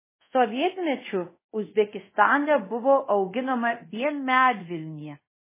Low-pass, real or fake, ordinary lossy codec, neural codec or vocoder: 3.6 kHz; fake; MP3, 16 kbps; codec, 24 kHz, 0.5 kbps, DualCodec